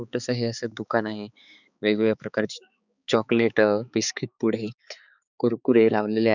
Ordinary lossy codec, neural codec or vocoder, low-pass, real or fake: none; codec, 16 kHz, 4 kbps, X-Codec, HuBERT features, trained on balanced general audio; 7.2 kHz; fake